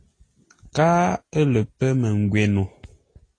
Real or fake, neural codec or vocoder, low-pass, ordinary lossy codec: real; none; 9.9 kHz; AAC, 32 kbps